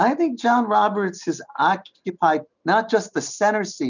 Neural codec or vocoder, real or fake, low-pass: none; real; 7.2 kHz